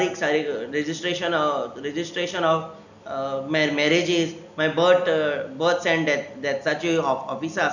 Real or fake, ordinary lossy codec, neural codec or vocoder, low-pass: real; none; none; 7.2 kHz